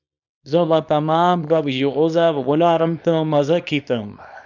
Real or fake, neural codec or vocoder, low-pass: fake; codec, 24 kHz, 0.9 kbps, WavTokenizer, small release; 7.2 kHz